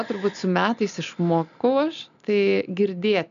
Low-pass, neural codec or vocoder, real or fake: 7.2 kHz; none; real